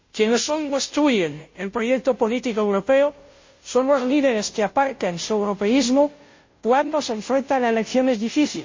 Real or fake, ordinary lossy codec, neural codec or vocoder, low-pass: fake; MP3, 32 kbps; codec, 16 kHz, 0.5 kbps, FunCodec, trained on Chinese and English, 25 frames a second; 7.2 kHz